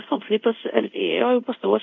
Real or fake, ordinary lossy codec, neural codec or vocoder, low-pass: fake; MP3, 64 kbps; codec, 24 kHz, 0.5 kbps, DualCodec; 7.2 kHz